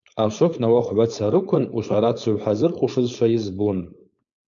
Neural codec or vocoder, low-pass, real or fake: codec, 16 kHz, 4.8 kbps, FACodec; 7.2 kHz; fake